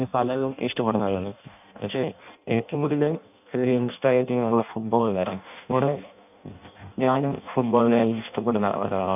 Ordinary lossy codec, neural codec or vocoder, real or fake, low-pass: none; codec, 16 kHz in and 24 kHz out, 0.6 kbps, FireRedTTS-2 codec; fake; 3.6 kHz